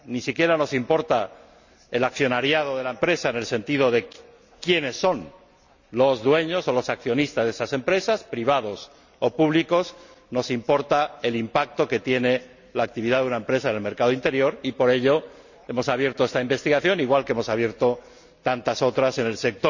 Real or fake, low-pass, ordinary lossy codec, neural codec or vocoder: real; 7.2 kHz; none; none